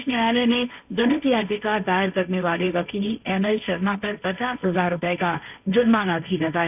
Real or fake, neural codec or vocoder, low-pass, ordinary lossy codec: fake; codec, 16 kHz, 1.1 kbps, Voila-Tokenizer; 3.6 kHz; none